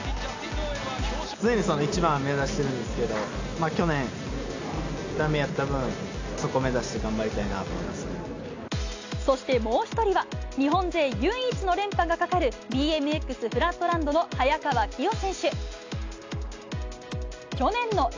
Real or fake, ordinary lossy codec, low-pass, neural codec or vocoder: real; AAC, 48 kbps; 7.2 kHz; none